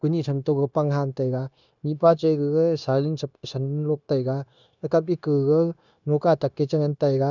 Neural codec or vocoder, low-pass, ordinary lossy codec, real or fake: codec, 16 kHz, 0.9 kbps, LongCat-Audio-Codec; 7.2 kHz; none; fake